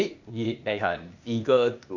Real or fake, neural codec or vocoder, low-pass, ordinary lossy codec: fake; codec, 16 kHz, 0.8 kbps, ZipCodec; 7.2 kHz; none